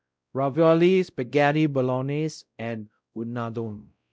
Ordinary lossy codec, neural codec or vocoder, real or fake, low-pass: none; codec, 16 kHz, 0.5 kbps, X-Codec, WavLM features, trained on Multilingual LibriSpeech; fake; none